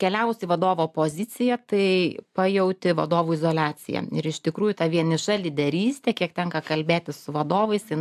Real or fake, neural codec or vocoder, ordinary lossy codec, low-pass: real; none; AAC, 96 kbps; 14.4 kHz